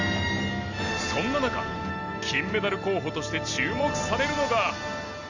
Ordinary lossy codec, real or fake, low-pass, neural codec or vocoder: none; real; 7.2 kHz; none